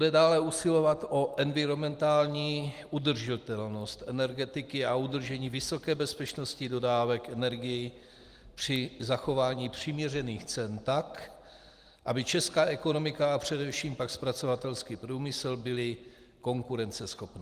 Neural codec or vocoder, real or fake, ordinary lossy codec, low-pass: none; real; Opus, 24 kbps; 14.4 kHz